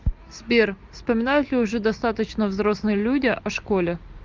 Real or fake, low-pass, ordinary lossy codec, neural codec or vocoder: real; 7.2 kHz; Opus, 32 kbps; none